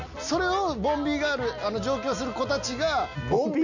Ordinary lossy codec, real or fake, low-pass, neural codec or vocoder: none; real; 7.2 kHz; none